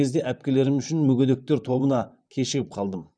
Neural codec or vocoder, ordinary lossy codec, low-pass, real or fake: vocoder, 44.1 kHz, 128 mel bands, Pupu-Vocoder; none; 9.9 kHz; fake